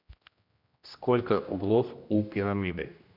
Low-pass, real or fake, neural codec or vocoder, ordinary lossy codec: 5.4 kHz; fake; codec, 16 kHz, 1 kbps, X-Codec, HuBERT features, trained on general audio; MP3, 48 kbps